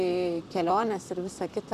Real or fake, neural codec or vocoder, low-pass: fake; vocoder, 44.1 kHz, 128 mel bands, Pupu-Vocoder; 14.4 kHz